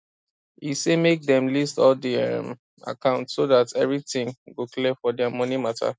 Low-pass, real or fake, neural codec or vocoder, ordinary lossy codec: none; real; none; none